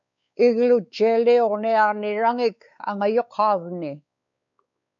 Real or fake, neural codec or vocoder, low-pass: fake; codec, 16 kHz, 4 kbps, X-Codec, WavLM features, trained on Multilingual LibriSpeech; 7.2 kHz